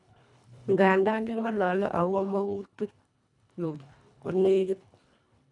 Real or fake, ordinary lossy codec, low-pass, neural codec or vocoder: fake; none; 10.8 kHz; codec, 24 kHz, 1.5 kbps, HILCodec